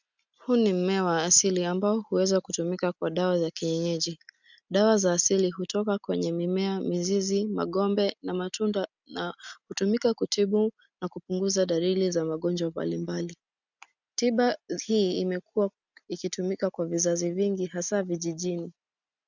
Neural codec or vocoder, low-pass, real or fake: none; 7.2 kHz; real